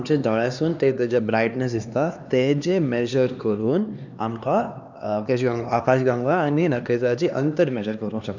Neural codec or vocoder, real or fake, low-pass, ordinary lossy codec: codec, 16 kHz, 2 kbps, X-Codec, HuBERT features, trained on LibriSpeech; fake; 7.2 kHz; none